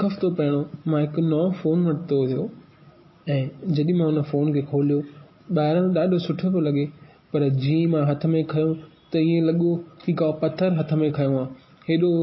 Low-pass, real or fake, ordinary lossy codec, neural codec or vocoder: 7.2 kHz; real; MP3, 24 kbps; none